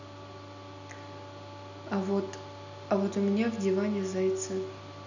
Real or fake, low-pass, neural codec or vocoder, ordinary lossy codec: real; 7.2 kHz; none; none